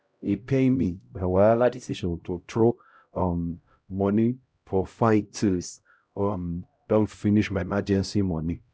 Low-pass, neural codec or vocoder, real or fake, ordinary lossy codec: none; codec, 16 kHz, 0.5 kbps, X-Codec, HuBERT features, trained on LibriSpeech; fake; none